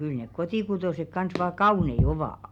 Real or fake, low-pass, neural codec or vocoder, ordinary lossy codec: real; 19.8 kHz; none; none